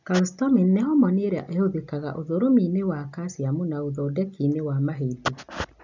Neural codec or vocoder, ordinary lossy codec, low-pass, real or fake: none; none; 7.2 kHz; real